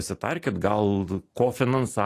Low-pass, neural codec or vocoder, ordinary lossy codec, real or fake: 14.4 kHz; none; AAC, 48 kbps; real